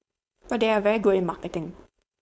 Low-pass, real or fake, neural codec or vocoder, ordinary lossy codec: none; fake; codec, 16 kHz, 4.8 kbps, FACodec; none